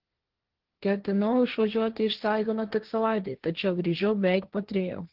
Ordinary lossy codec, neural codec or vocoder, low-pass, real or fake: Opus, 16 kbps; codec, 16 kHz, 1.1 kbps, Voila-Tokenizer; 5.4 kHz; fake